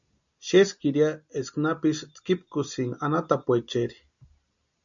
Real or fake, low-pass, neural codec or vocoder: real; 7.2 kHz; none